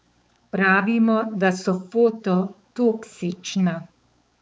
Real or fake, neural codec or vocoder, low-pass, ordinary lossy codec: fake; codec, 16 kHz, 4 kbps, X-Codec, HuBERT features, trained on balanced general audio; none; none